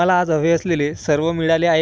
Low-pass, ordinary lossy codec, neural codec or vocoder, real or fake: none; none; none; real